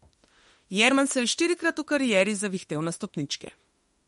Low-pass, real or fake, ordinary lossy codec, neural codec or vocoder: 19.8 kHz; fake; MP3, 48 kbps; autoencoder, 48 kHz, 32 numbers a frame, DAC-VAE, trained on Japanese speech